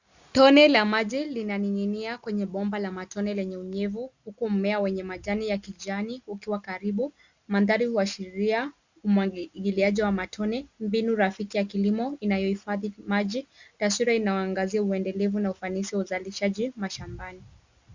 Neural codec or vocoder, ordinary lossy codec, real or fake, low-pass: none; Opus, 64 kbps; real; 7.2 kHz